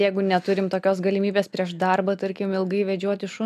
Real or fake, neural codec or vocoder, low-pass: real; none; 14.4 kHz